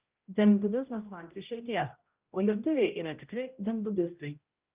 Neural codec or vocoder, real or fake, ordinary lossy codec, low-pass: codec, 16 kHz, 0.5 kbps, X-Codec, HuBERT features, trained on general audio; fake; Opus, 24 kbps; 3.6 kHz